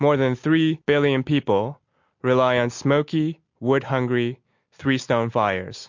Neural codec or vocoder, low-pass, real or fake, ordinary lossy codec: none; 7.2 kHz; real; MP3, 48 kbps